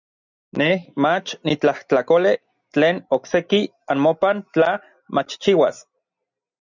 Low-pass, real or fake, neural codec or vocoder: 7.2 kHz; real; none